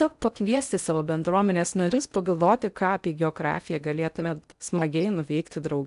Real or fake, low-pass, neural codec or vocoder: fake; 10.8 kHz; codec, 16 kHz in and 24 kHz out, 0.6 kbps, FocalCodec, streaming, 4096 codes